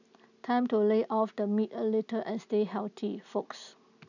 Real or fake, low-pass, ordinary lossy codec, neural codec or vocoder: real; 7.2 kHz; none; none